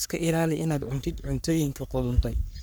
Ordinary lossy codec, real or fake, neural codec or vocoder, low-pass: none; fake; codec, 44.1 kHz, 3.4 kbps, Pupu-Codec; none